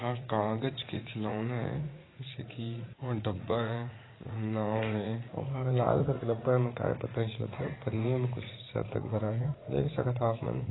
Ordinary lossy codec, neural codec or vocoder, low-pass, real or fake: AAC, 16 kbps; vocoder, 44.1 kHz, 80 mel bands, Vocos; 7.2 kHz; fake